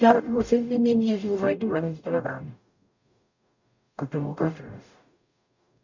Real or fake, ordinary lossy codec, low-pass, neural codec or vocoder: fake; none; 7.2 kHz; codec, 44.1 kHz, 0.9 kbps, DAC